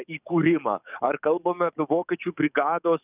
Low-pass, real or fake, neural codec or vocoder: 3.6 kHz; fake; codec, 44.1 kHz, 7.8 kbps, DAC